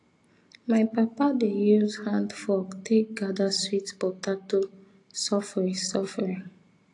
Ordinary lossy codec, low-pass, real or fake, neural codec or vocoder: AAC, 48 kbps; 10.8 kHz; real; none